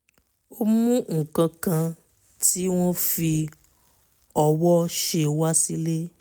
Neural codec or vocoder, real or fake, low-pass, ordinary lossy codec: none; real; none; none